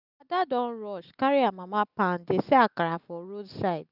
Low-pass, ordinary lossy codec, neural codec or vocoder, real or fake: 5.4 kHz; none; none; real